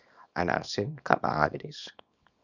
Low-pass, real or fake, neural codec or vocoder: 7.2 kHz; fake; codec, 24 kHz, 0.9 kbps, WavTokenizer, small release